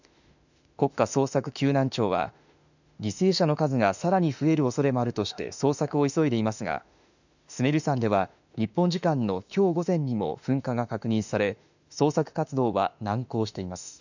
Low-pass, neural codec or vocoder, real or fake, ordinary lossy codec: 7.2 kHz; autoencoder, 48 kHz, 32 numbers a frame, DAC-VAE, trained on Japanese speech; fake; none